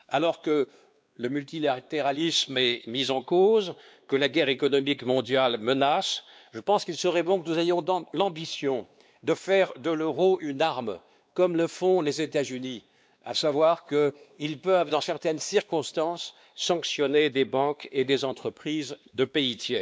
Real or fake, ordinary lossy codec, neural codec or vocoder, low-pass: fake; none; codec, 16 kHz, 2 kbps, X-Codec, WavLM features, trained on Multilingual LibriSpeech; none